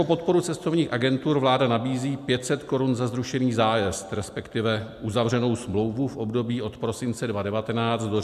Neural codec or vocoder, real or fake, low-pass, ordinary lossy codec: none; real; 14.4 kHz; MP3, 96 kbps